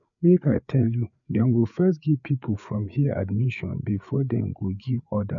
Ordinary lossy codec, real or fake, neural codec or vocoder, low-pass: none; fake; codec, 16 kHz, 4 kbps, FreqCodec, larger model; 7.2 kHz